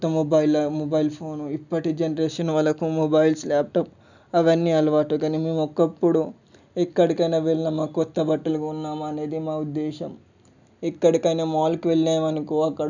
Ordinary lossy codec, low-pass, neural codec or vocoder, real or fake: none; 7.2 kHz; none; real